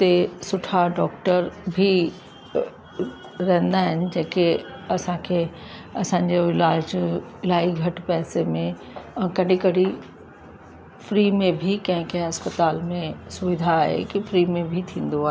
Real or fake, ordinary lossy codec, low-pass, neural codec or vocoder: real; none; none; none